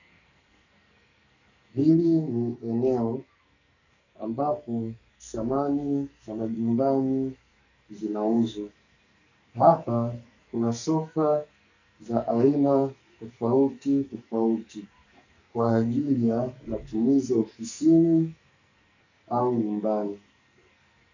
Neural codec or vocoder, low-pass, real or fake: codec, 44.1 kHz, 2.6 kbps, SNAC; 7.2 kHz; fake